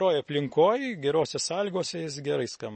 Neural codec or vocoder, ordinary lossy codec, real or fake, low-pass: none; MP3, 32 kbps; real; 10.8 kHz